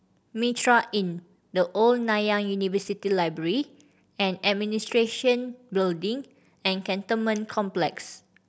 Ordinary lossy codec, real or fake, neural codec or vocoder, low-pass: none; real; none; none